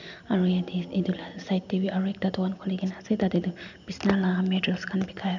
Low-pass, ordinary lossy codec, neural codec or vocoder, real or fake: 7.2 kHz; none; none; real